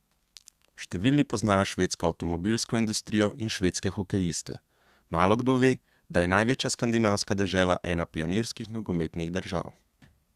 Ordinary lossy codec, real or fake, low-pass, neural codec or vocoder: Opus, 64 kbps; fake; 14.4 kHz; codec, 32 kHz, 1.9 kbps, SNAC